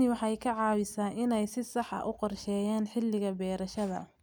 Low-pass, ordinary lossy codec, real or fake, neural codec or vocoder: none; none; real; none